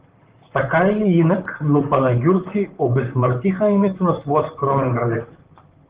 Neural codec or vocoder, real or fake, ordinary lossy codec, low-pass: vocoder, 44.1 kHz, 128 mel bands, Pupu-Vocoder; fake; Opus, 16 kbps; 3.6 kHz